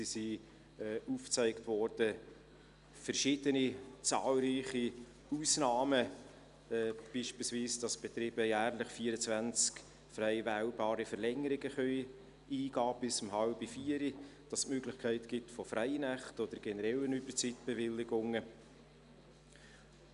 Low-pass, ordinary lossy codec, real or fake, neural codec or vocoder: 10.8 kHz; none; real; none